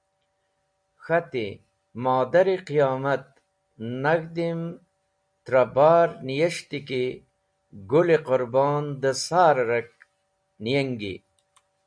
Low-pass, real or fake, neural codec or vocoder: 9.9 kHz; real; none